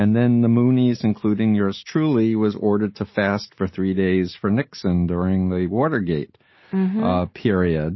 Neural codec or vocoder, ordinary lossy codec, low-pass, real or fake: none; MP3, 24 kbps; 7.2 kHz; real